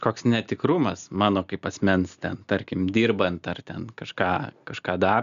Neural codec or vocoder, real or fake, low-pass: none; real; 7.2 kHz